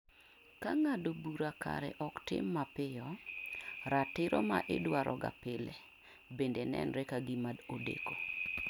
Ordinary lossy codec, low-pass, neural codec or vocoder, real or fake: none; 19.8 kHz; none; real